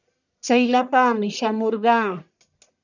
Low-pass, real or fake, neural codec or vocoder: 7.2 kHz; fake; codec, 44.1 kHz, 1.7 kbps, Pupu-Codec